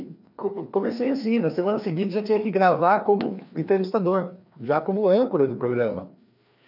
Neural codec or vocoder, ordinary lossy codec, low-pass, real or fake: codec, 16 kHz, 2 kbps, FreqCodec, larger model; none; 5.4 kHz; fake